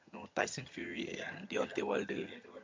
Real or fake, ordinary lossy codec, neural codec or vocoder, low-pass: fake; MP3, 48 kbps; vocoder, 22.05 kHz, 80 mel bands, HiFi-GAN; 7.2 kHz